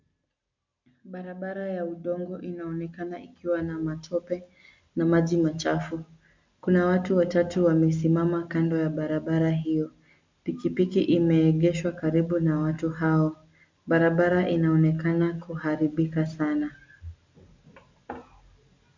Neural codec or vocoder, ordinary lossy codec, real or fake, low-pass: none; MP3, 64 kbps; real; 7.2 kHz